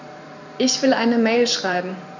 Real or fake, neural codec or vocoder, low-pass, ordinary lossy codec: fake; vocoder, 44.1 kHz, 128 mel bands every 512 samples, BigVGAN v2; 7.2 kHz; none